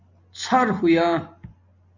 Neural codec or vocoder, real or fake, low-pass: none; real; 7.2 kHz